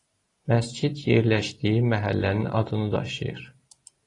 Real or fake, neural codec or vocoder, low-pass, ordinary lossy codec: real; none; 10.8 kHz; AAC, 32 kbps